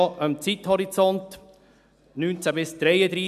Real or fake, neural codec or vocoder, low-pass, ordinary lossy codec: real; none; 14.4 kHz; none